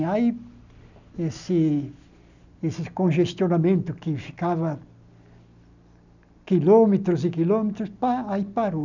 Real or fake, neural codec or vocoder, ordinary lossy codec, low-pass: real; none; none; 7.2 kHz